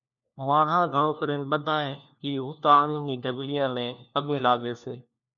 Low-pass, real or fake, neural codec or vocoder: 7.2 kHz; fake; codec, 16 kHz, 1 kbps, FunCodec, trained on LibriTTS, 50 frames a second